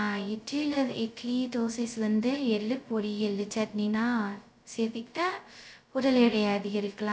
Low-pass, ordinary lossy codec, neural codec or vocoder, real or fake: none; none; codec, 16 kHz, 0.2 kbps, FocalCodec; fake